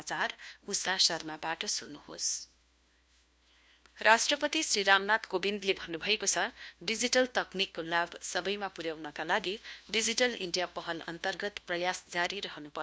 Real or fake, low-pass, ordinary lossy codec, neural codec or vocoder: fake; none; none; codec, 16 kHz, 1 kbps, FunCodec, trained on LibriTTS, 50 frames a second